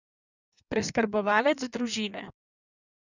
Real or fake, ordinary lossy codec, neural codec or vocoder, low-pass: fake; none; codec, 16 kHz in and 24 kHz out, 1.1 kbps, FireRedTTS-2 codec; 7.2 kHz